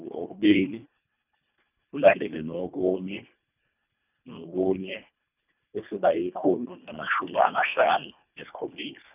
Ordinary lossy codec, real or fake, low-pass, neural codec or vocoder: none; fake; 3.6 kHz; codec, 24 kHz, 1.5 kbps, HILCodec